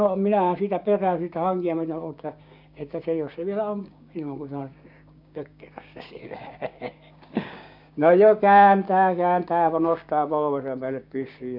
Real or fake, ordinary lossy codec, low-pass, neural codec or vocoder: fake; none; 5.4 kHz; codec, 16 kHz, 6 kbps, DAC